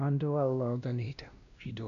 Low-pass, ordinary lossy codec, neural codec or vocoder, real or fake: 7.2 kHz; none; codec, 16 kHz, 1 kbps, X-Codec, WavLM features, trained on Multilingual LibriSpeech; fake